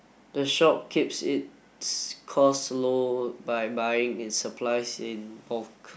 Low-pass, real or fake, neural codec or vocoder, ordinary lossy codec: none; real; none; none